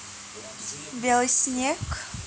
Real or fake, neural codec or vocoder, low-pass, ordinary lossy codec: real; none; none; none